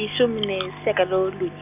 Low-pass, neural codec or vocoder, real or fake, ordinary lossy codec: 3.6 kHz; none; real; none